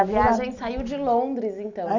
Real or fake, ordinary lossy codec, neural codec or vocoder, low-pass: real; none; none; 7.2 kHz